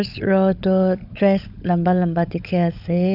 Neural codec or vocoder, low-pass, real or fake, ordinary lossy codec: codec, 16 kHz, 16 kbps, FunCodec, trained on LibriTTS, 50 frames a second; 5.4 kHz; fake; none